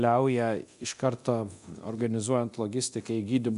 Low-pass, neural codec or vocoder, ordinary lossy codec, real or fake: 10.8 kHz; codec, 24 kHz, 0.9 kbps, DualCodec; MP3, 96 kbps; fake